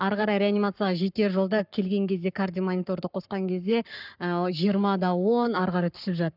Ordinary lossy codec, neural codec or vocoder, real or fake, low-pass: none; codec, 44.1 kHz, 7.8 kbps, Pupu-Codec; fake; 5.4 kHz